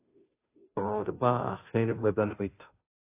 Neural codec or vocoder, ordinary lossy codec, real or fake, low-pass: codec, 16 kHz, 0.5 kbps, FunCodec, trained on Chinese and English, 25 frames a second; AAC, 24 kbps; fake; 3.6 kHz